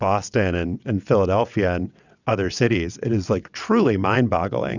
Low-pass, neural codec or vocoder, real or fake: 7.2 kHz; vocoder, 22.05 kHz, 80 mel bands, WaveNeXt; fake